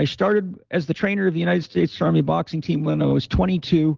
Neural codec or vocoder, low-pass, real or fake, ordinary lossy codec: none; 7.2 kHz; real; Opus, 16 kbps